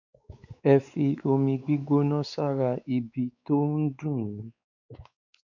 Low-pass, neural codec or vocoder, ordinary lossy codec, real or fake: 7.2 kHz; codec, 16 kHz, 4 kbps, X-Codec, WavLM features, trained on Multilingual LibriSpeech; none; fake